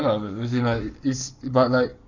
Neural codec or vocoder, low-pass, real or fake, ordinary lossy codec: codec, 44.1 kHz, 7.8 kbps, Pupu-Codec; 7.2 kHz; fake; none